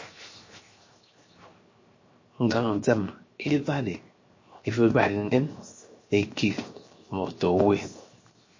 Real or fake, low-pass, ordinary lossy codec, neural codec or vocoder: fake; 7.2 kHz; MP3, 32 kbps; codec, 16 kHz, 0.7 kbps, FocalCodec